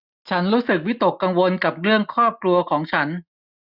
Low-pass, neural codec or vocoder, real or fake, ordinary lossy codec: 5.4 kHz; none; real; none